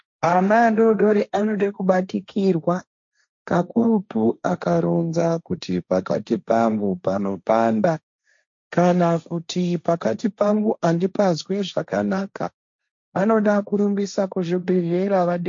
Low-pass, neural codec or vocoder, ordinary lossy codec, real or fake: 7.2 kHz; codec, 16 kHz, 1.1 kbps, Voila-Tokenizer; MP3, 48 kbps; fake